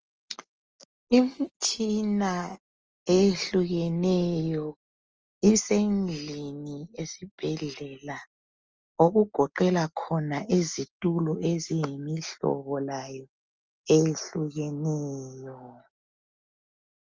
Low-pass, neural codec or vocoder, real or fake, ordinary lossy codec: 7.2 kHz; none; real; Opus, 24 kbps